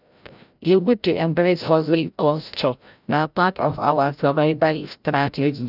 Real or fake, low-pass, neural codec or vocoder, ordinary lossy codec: fake; 5.4 kHz; codec, 16 kHz, 0.5 kbps, FreqCodec, larger model; none